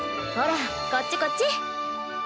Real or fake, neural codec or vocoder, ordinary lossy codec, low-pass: real; none; none; none